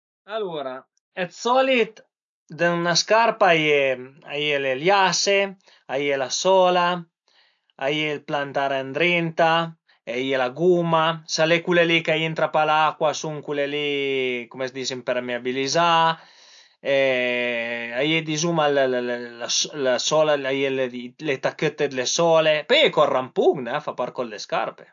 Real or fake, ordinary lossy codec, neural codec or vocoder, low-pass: real; AAC, 64 kbps; none; 7.2 kHz